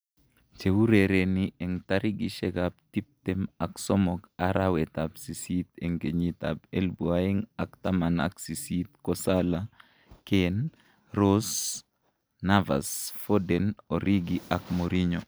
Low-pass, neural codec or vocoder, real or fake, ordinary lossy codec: none; none; real; none